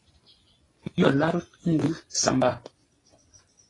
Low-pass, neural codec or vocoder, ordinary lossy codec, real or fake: 10.8 kHz; vocoder, 24 kHz, 100 mel bands, Vocos; AAC, 32 kbps; fake